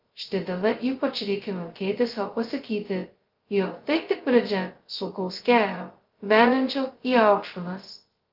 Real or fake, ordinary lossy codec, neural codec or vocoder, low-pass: fake; Opus, 32 kbps; codec, 16 kHz, 0.2 kbps, FocalCodec; 5.4 kHz